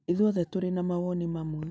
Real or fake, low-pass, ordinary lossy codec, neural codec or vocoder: real; none; none; none